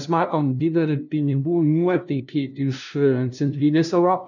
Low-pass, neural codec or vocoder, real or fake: 7.2 kHz; codec, 16 kHz, 0.5 kbps, FunCodec, trained on LibriTTS, 25 frames a second; fake